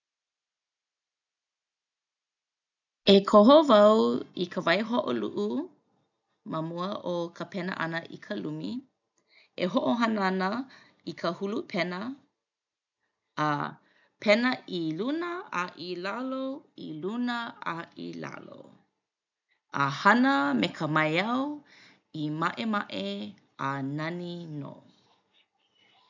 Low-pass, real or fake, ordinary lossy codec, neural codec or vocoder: 7.2 kHz; real; none; none